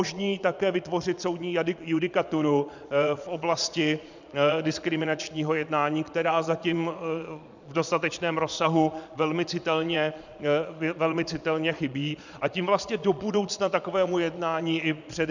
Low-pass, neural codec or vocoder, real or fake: 7.2 kHz; vocoder, 22.05 kHz, 80 mel bands, Vocos; fake